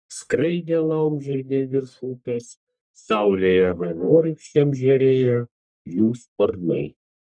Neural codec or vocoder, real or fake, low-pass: codec, 44.1 kHz, 1.7 kbps, Pupu-Codec; fake; 9.9 kHz